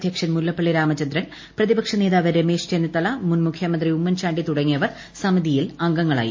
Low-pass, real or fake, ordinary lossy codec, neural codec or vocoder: 7.2 kHz; real; MP3, 32 kbps; none